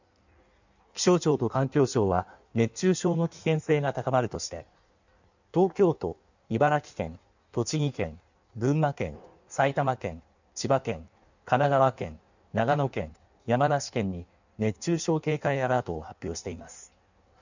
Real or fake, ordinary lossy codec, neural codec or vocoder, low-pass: fake; none; codec, 16 kHz in and 24 kHz out, 1.1 kbps, FireRedTTS-2 codec; 7.2 kHz